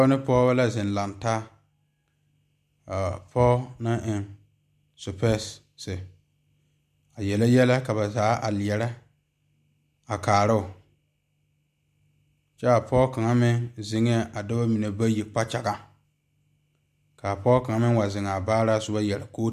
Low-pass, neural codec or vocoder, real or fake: 14.4 kHz; none; real